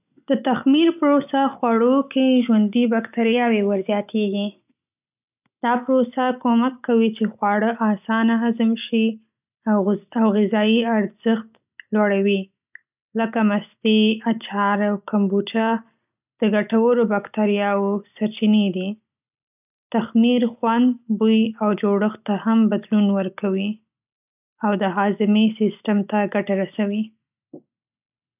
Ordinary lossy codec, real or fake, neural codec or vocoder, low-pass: none; real; none; 3.6 kHz